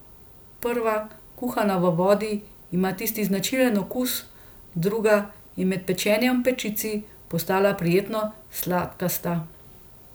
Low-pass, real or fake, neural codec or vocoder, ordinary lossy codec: none; real; none; none